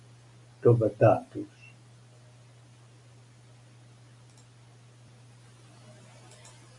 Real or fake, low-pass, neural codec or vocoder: real; 10.8 kHz; none